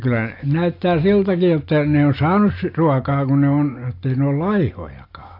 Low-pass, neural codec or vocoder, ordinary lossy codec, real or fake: 5.4 kHz; none; AAC, 32 kbps; real